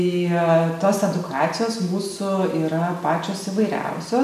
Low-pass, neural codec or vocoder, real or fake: 14.4 kHz; none; real